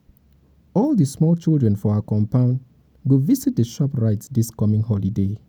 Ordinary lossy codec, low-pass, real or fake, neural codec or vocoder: none; none; real; none